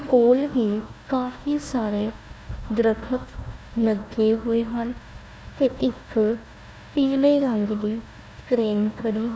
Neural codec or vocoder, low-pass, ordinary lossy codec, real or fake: codec, 16 kHz, 1 kbps, FunCodec, trained on Chinese and English, 50 frames a second; none; none; fake